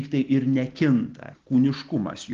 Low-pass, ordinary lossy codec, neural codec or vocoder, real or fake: 7.2 kHz; Opus, 16 kbps; none; real